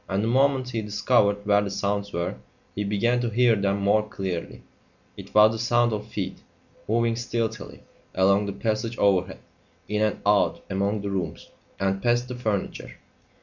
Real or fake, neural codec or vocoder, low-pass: real; none; 7.2 kHz